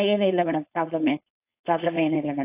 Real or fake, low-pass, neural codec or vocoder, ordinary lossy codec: fake; 3.6 kHz; codec, 16 kHz, 4.8 kbps, FACodec; AAC, 24 kbps